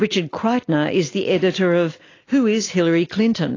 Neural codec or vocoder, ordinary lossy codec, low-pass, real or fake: none; AAC, 32 kbps; 7.2 kHz; real